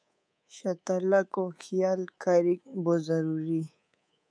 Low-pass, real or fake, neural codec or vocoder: 9.9 kHz; fake; codec, 24 kHz, 3.1 kbps, DualCodec